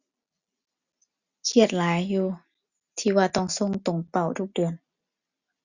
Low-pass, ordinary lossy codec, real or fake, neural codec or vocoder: 7.2 kHz; Opus, 64 kbps; real; none